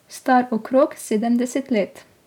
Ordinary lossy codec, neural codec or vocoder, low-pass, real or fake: none; none; 19.8 kHz; real